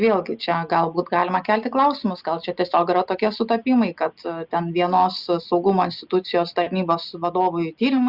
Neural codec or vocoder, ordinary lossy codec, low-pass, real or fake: none; Opus, 64 kbps; 5.4 kHz; real